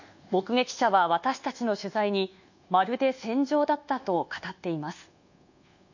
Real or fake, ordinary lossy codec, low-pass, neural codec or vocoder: fake; none; 7.2 kHz; codec, 24 kHz, 1.2 kbps, DualCodec